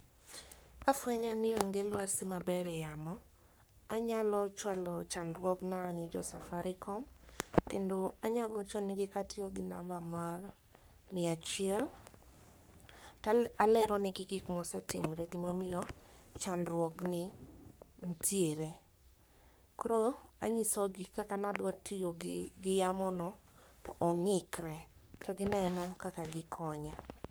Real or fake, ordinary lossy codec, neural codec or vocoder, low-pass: fake; none; codec, 44.1 kHz, 3.4 kbps, Pupu-Codec; none